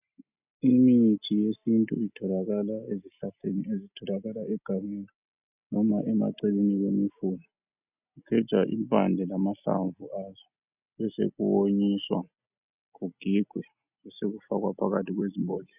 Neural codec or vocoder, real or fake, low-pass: none; real; 3.6 kHz